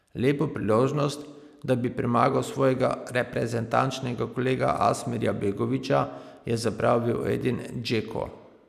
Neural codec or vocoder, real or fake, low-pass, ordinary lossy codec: none; real; 14.4 kHz; none